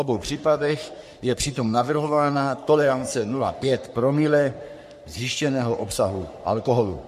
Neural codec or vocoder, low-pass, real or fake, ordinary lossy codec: codec, 44.1 kHz, 3.4 kbps, Pupu-Codec; 14.4 kHz; fake; MP3, 64 kbps